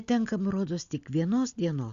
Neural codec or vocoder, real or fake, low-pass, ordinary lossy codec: none; real; 7.2 kHz; Opus, 64 kbps